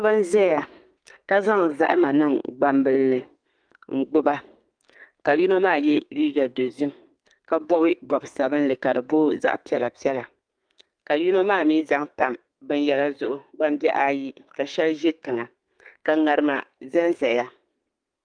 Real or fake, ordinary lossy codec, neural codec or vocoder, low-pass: fake; Opus, 32 kbps; codec, 32 kHz, 1.9 kbps, SNAC; 9.9 kHz